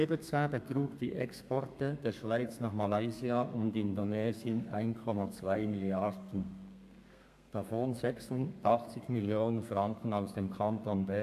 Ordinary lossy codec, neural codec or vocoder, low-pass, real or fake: AAC, 96 kbps; codec, 32 kHz, 1.9 kbps, SNAC; 14.4 kHz; fake